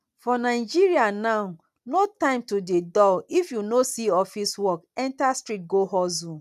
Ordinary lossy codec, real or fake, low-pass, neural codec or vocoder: none; real; 14.4 kHz; none